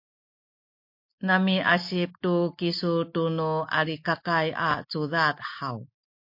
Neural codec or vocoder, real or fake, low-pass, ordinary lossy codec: none; real; 5.4 kHz; MP3, 32 kbps